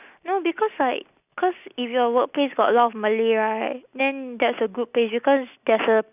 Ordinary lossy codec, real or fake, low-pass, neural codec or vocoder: none; real; 3.6 kHz; none